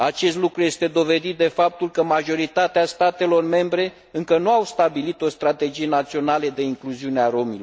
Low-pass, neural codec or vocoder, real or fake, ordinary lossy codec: none; none; real; none